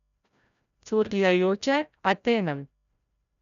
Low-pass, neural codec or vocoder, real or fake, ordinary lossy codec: 7.2 kHz; codec, 16 kHz, 0.5 kbps, FreqCodec, larger model; fake; none